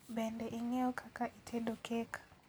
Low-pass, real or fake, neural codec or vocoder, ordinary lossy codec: none; real; none; none